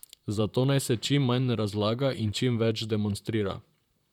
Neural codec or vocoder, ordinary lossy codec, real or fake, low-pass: vocoder, 44.1 kHz, 128 mel bands, Pupu-Vocoder; none; fake; 19.8 kHz